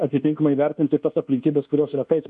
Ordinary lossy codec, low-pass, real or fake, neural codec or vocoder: MP3, 96 kbps; 10.8 kHz; fake; codec, 24 kHz, 1.2 kbps, DualCodec